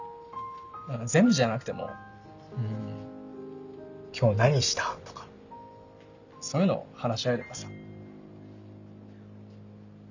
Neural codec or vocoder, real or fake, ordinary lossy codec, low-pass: none; real; none; 7.2 kHz